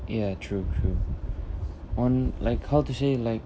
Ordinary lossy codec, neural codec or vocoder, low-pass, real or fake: none; none; none; real